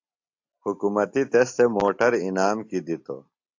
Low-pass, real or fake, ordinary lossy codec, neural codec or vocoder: 7.2 kHz; real; AAC, 48 kbps; none